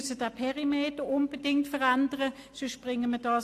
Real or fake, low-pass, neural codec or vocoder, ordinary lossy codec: real; 14.4 kHz; none; AAC, 64 kbps